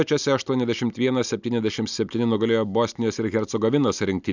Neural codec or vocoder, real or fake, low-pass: none; real; 7.2 kHz